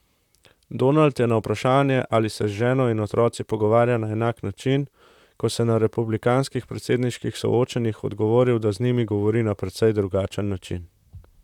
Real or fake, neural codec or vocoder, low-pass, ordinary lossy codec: fake; vocoder, 44.1 kHz, 128 mel bands, Pupu-Vocoder; 19.8 kHz; none